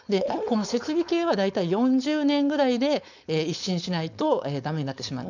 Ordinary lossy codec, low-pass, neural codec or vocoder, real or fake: none; 7.2 kHz; codec, 16 kHz, 4.8 kbps, FACodec; fake